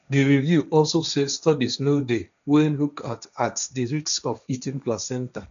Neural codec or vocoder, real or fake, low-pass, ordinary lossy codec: codec, 16 kHz, 1.1 kbps, Voila-Tokenizer; fake; 7.2 kHz; none